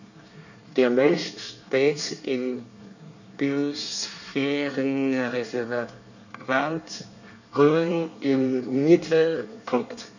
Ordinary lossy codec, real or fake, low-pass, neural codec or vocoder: none; fake; 7.2 kHz; codec, 24 kHz, 1 kbps, SNAC